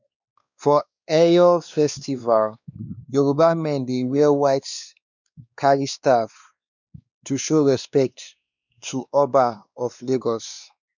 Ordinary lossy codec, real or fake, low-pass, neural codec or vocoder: none; fake; 7.2 kHz; codec, 16 kHz, 2 kbps, X-Codec, WavLM features, trained on Multilingual LibriSpeech